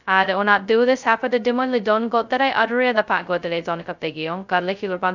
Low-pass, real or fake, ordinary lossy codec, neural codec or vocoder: 7.2 kHz; fake; none; codec, 16 kHz, 0.2 kbps, FocalCodec